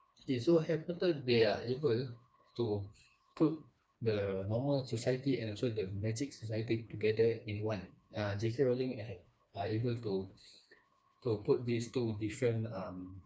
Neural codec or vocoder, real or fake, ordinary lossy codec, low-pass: codec, 16 kHz, 2 kbps, FreqCodec, smaller model; fake; none; none